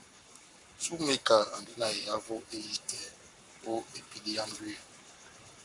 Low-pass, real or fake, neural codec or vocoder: 10.8 kHz; fake; codec, 44.1 kHz, 7.8 kbps, Pupu-Codec